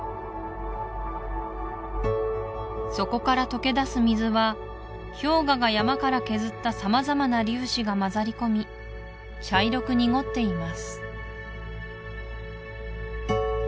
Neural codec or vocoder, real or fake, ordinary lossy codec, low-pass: none; real; none; none